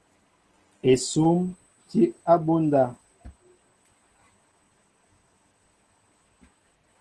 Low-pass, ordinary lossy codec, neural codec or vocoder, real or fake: 10.8 kHz; Opus, 16 kbps; none; real